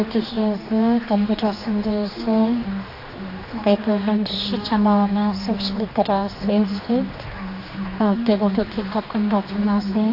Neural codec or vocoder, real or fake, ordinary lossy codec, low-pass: codec, 16 kHz, 2 kbps, X-Codec, HuBERT features, trained on general audio; fake; AAC, 32 kbps; 5.4 kHz